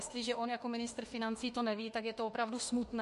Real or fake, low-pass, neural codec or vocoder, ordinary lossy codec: fake; 14.4 kHz; autoencoder, 48 kHz, 32 numbers a frame, DAC-VAE, trained on Japanese speech; MP3, 48 kbps